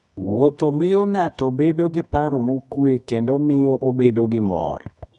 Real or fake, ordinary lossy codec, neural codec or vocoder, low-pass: fake; none; codec, 24 kHz, 0.9 kbps, WavTokenizer, medium music audio release; 10.8 kHz